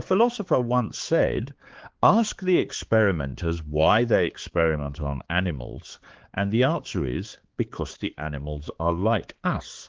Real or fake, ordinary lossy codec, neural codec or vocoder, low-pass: fake; Opus, 16 kbps; codec, 16 kHz, 4 kbps, X-Codec, HuBERT features, trained on balanced general audio; 7.2 kHz